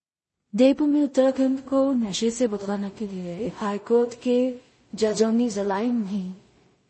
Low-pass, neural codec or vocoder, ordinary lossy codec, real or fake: 10.8 kHz; codec, 16 kHz in and 24 kHz out, 0.4 kbps, LongCat-Audio-Codec, two codebook decoder; MP3, 32 kbps; fake